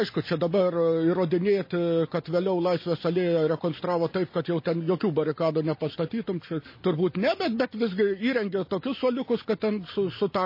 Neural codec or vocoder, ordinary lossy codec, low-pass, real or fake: none; MP3, 24 kbps; 5.4 kHz; real